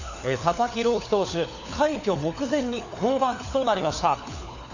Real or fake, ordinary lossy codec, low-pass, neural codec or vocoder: fake; none; 7.2 kHz; codec, 16 kHz, 4 kbps, FunCodec, trained on LibriTTS, 50 frames a second